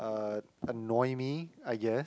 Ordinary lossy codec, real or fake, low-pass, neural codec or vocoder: none; real; none; none